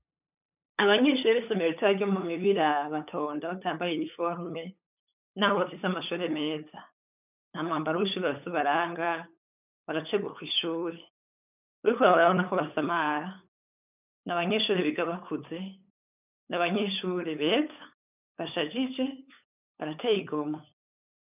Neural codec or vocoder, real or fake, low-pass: codec, 16 kHz, 8 kbps, FunCodec, trained on LibriTTS, 25 frames a second; fake; 3.6 kHz